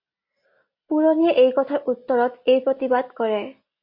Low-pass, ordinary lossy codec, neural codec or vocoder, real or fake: 5.4 kHz; MP3, 32 kbps; none; real